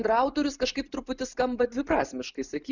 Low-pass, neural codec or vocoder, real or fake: 7.2 kHz; none; real